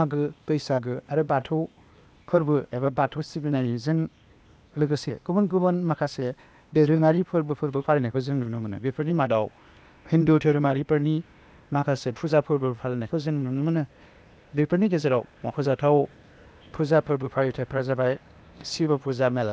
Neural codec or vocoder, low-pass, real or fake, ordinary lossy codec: codec, 16 kHz, 0.8 kbps, ZipCodec; none; fake; none